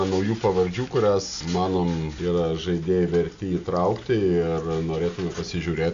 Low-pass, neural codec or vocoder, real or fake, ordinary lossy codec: 7.2 kHz; none; real; AAC, 64 kbps